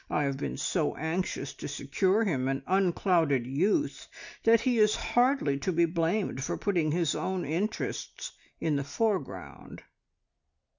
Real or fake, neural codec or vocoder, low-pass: real; none; 7.2 kHz